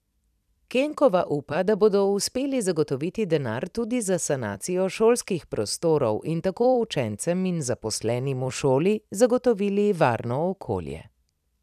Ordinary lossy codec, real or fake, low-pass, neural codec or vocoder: none; fake; 14.4 kHz; vocoder, 44.1 kHz, 128 mel bands every 256 samples, BigVGAN v2